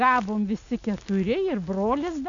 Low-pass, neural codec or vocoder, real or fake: 7.2 kHz; none; real